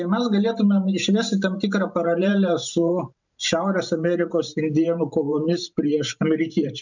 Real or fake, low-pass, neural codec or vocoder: fake; 7.2 kHz; vocoder, 44.1 kHz, 128 mel bands every 512 samples, BigVGAN v2